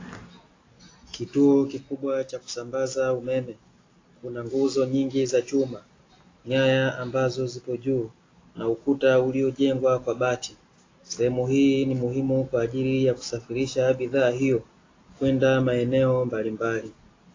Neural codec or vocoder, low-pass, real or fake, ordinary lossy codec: none; 7.2 kHz; real; AAC, 32 kbps